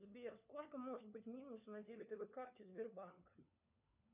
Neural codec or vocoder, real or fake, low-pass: codec, 16 kHz, 4 kbps, FunCodec, trained on LibriTTS, 50 frames a second; fake; 3.6 kHz